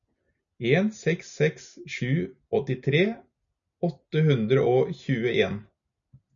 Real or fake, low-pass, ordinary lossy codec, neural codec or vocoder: real; 7.2 kHz; MP3, 96 kbps; none